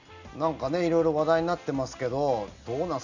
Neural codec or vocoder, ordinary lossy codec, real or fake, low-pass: none; none; real; 7.2 kHz